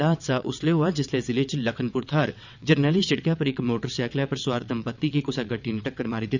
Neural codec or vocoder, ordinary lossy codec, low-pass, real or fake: vocoder, 22.05 kHz, 80 mel bands, WaveNeXt; none; 7.2 kHz; fake